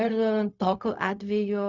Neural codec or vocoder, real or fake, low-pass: codec, 16 kHz, 0.4 kbps, LongCat-Audio-Codec; fake; 7.2 kHz